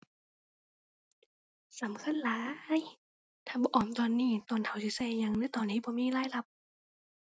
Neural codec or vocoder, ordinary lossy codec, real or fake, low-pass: none; none; real; none